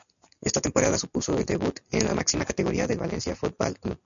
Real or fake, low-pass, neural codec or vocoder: real; 7.2 kHz; none